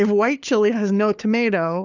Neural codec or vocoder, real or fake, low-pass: codec, 16 kHz, 4 kbps, FunCodec, trained on LibriTTS, 50 frames a second; fake; 7.2 kHz